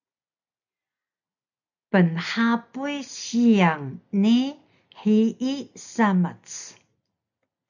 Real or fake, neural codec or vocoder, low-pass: real; none; 7.2 kHz